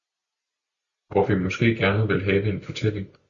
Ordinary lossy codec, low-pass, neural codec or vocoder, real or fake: Opus, 64 kbps; 7.2 kHz; none; real